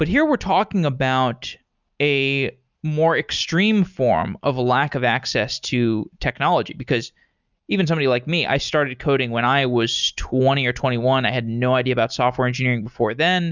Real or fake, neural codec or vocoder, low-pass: real; none; 7.2 kHz